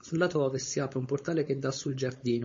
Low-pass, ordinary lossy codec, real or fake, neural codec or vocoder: 7.2 kHz; MP3, 32 kbps; fake; codec, 16 kHz, 4.8 kbps, FACodec